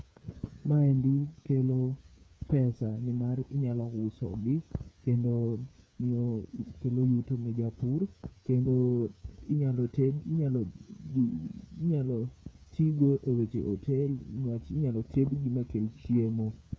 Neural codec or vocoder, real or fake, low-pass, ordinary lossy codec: codec, 16 kHz, 8 kbps, FreqCodec, smaller model; fake; none; none